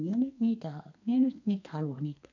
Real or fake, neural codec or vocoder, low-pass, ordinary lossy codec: fake; codec, 32 kHz, 1.9 kbps, SNAC; 7.2 kHz; none